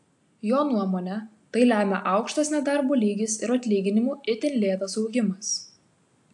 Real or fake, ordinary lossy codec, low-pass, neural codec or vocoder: real; AAC, 64 kbps; 10.8 kHz; none